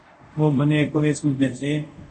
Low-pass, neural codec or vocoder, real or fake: 10.8 kHz; codec, 24 kHz, 0.5 kbps, DualCodec; fake